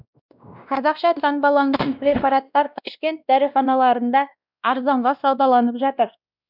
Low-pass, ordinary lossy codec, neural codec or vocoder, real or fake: 5.4 kHz; none; codec, 16 kHz, 1 kbps, X-Codec, WavLM features, trained on Multilingual LibriSpeech; fake